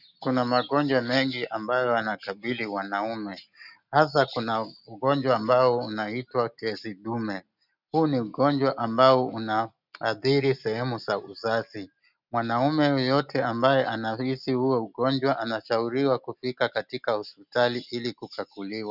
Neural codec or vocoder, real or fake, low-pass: none; real; 5.4 kHz